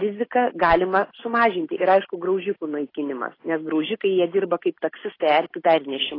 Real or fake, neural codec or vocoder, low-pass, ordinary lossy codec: real; none; 5.4 kHz; AAC, 24 kbps